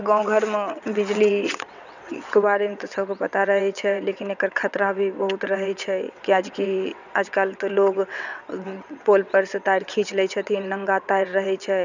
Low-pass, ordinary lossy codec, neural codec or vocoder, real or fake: 7.2 kHz; none; vocoder, 22.05 kHz, 80 mel bands, WaveNeXt; fake